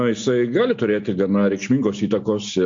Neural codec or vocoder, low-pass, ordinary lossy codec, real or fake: none; 7.2 kHz; MP3, 48 kbps; real